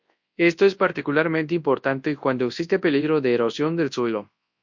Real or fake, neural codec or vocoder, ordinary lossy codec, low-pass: fake; codec, 24 kHz, 0.9 kbps, WavTokenizer, large speech release; MP3, 48 kbps; 7.2 kHz